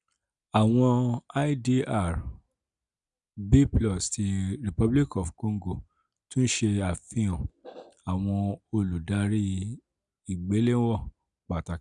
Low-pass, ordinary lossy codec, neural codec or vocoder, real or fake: 10.8 kHz; none; none; real